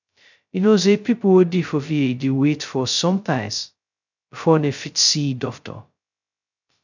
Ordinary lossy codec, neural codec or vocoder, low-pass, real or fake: none; codec, 16 kHz, 0.2 kbps, FocalCodec; 7.2 kHz; fake